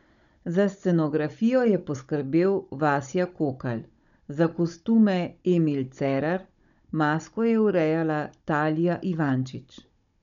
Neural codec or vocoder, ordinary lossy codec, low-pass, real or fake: codec, 16 kHz, 16 kbps, FunCodec, trained on Chinese and English, 50 frames a second; none; 7.2 kHz; fake